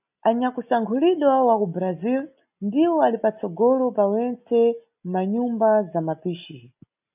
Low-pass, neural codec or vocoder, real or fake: 3.6 kHz; none; real